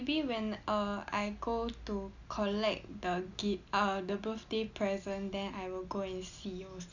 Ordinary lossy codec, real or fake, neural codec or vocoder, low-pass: none; real; none; 7.2 kHz